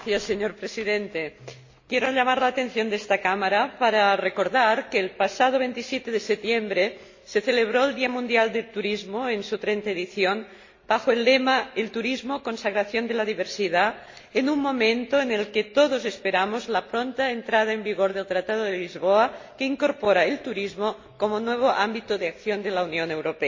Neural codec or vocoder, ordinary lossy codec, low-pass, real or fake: none; MP3, 32 kbps; 7.2 kHz; real